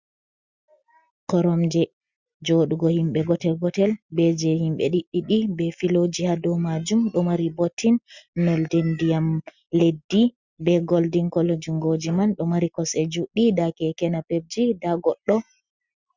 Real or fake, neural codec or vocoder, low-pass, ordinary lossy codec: real; none; 7.2 kHz; Opus, 64 kbps